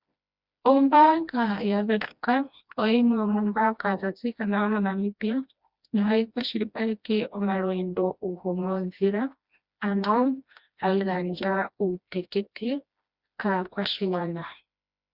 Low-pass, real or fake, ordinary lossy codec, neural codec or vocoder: 5.4 kHz; fake; Opus, 64 kbps; codec, 16 kHz, 1 kbps, FreqCodec, smaller model